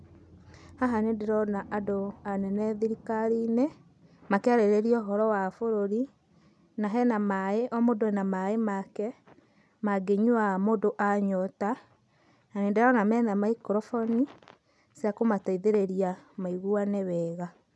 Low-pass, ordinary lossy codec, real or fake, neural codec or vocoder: none; none; real; none